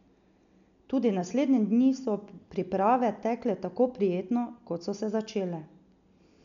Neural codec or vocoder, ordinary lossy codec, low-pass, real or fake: none; none; 7.2 kHz; real